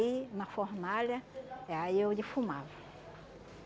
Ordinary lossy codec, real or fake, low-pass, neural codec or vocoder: none; real; none; none